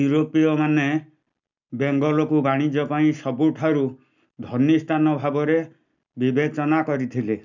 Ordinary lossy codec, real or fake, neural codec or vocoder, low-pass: none; real; none; 7.2 kHz